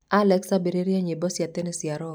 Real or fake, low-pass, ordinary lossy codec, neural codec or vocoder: real; none; none; none